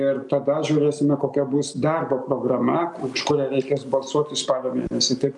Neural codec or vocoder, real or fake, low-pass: none; real; 10.8 kHz